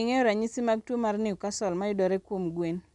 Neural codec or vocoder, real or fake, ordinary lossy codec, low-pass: none; real; none; 10.8 kHz